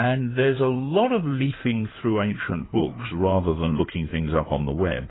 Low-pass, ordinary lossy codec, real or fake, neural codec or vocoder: 7.2 kHz; AAC, 16 kbps; fake; codec, 16 kHz in and 24 kHz out, 2.2 kbps, FireRedTTS-2 codec